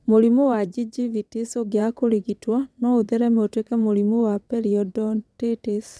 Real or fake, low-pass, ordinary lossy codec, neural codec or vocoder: fake; none; none; vocoder, 22.05 kHz, 80 mel bands, WaveNeXt